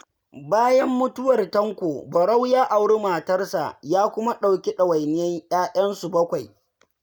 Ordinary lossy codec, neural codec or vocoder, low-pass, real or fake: none; none; none; real